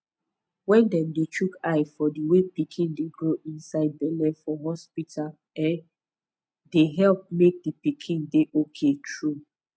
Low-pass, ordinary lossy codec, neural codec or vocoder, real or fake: none; none; none; real